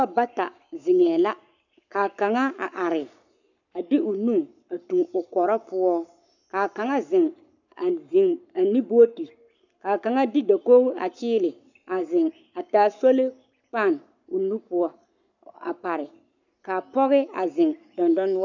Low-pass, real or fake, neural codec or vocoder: 7.2 kHz; fake; codec, 44.1 kHz, 7.8 kbps, Pupu-Codec